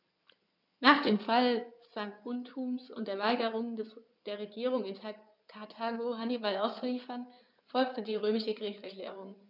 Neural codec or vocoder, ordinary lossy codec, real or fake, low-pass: codec, 16 kHz in and 24 kHz out, 2.2 kbps, FireRedTTS-2 codec; none; fake; 5.4 kHz